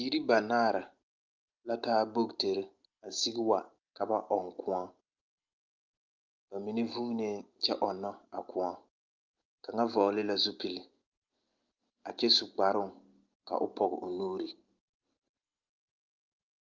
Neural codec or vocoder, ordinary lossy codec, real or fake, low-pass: none; Opus, 32 kbps; real; 7.2 kHz